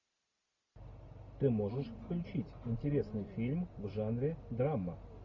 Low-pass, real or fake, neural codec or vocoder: 7.2 kHz; real; none